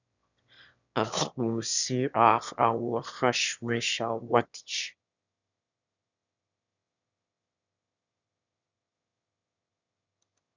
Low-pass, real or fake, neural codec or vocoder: 7.2 kHz; fake; autoencoder, 22.05 kHz, a latent of 192 numbers a frame, VITS, trained on one speaker